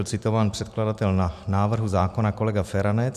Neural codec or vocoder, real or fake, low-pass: none; real; 14.4 kHz